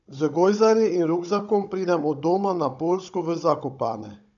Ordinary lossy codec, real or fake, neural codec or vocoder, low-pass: none; fake; codec, 16 kHz, 16 kbps, FunCodec, trained on Chinese and English, 50 frames a second; 7.2 kHz